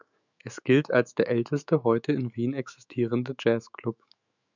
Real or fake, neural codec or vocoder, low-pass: fake; autoencoder, 48 kHz, 128 numbers a frame, DAC-VAE, trained on Japanese speech; 7.2 kHz